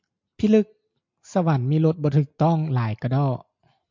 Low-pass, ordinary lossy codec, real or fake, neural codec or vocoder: 7.2 kHz; MP3, 64 kbps; real; none